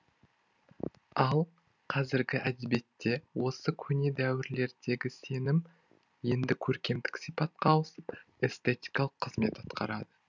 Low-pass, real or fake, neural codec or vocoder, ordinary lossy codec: 7.2 kHz; real; none; none